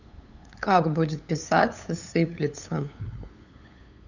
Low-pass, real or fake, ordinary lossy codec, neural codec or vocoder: 7.2 kHz; fake; none; codec, 16 kHz, 8 kbps, FunCodec, trained on LibriTTS, 25 frames a second